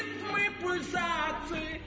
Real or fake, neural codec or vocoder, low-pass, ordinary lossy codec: real; none; none; none